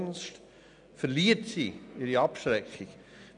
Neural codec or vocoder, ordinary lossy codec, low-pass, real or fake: none; none; 9.9 kHz; real